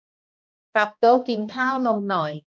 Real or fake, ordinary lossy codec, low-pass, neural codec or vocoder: fake; none; none; codec, 16 kHz, 1 kbps, X-Codec, HuBERT features, trained on balanced general audio